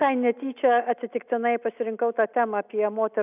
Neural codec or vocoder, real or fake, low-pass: none; real; 3.6 kHz